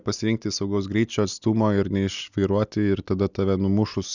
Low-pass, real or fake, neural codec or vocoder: 7.2 kHz; real; none